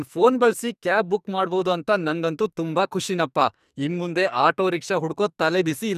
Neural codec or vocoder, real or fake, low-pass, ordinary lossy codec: codec, 44.1 kHz, 2.6 kbps, SNAC; fake; 14.4 kHz; none